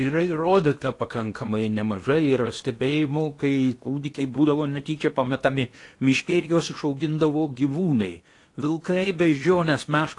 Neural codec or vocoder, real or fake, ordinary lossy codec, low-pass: codec, 16 kHz in and 24 kHz out, 0.8 kbps, FocalCodec, streaming, 65536 codes; fake; AAC, 48 kbps; 10.8 kHz